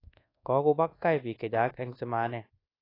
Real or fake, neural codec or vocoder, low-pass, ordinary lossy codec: fake; codec, 24 kHz, 1.2 kbps, DualCodec; 5.4 kHz; AAC, 24 kbps